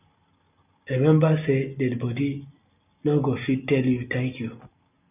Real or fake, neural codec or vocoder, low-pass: real; none; 3.6 kHz